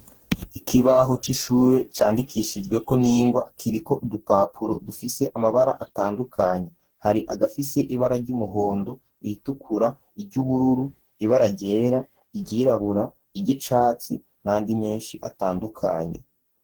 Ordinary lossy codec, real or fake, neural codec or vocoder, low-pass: Opus, 16 kbps; fake; codec, 44.1 kHz, 2.6 kbps, DAC; 19.8 kHz